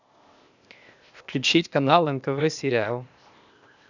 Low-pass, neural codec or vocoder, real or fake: 7.2 kHz; codec, 16 kHz, 0.8 kbps, ZipCodec; fake